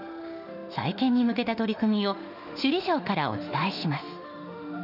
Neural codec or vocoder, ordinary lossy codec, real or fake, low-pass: autoencoder, 48 kHz, 32 numbers a frame, DAC-VAE, trained on Japanese speech; none; fake; 5.4 kHz